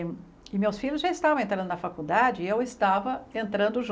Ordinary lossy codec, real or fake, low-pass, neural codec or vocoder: none; real; none; none